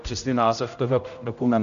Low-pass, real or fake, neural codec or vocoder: 7.2 kHz; fake; codec, 16 kHz, 0.5 kbps, X-Codec, HuBERT features, trained on general audio